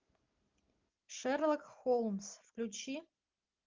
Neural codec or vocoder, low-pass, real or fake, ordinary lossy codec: none; 7.2 kHz; real; Opus, 24 kbps